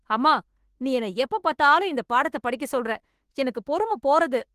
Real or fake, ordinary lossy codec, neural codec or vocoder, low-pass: fake; Opus, 16 kbps; autoencoder, 48 kHz, 32 numbers a frame, DAC-VAE, trained on Japanese speech; 14.4 kHz